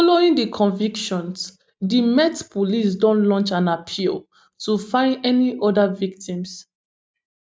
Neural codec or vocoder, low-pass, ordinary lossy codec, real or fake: none; none; none; real